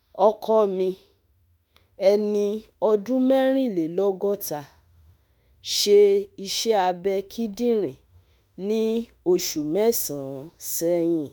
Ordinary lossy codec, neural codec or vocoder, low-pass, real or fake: none; autoencoder, 48 kHz, 32 numbers a frame, DAC-VAE, trained on Japanese speech; none; fake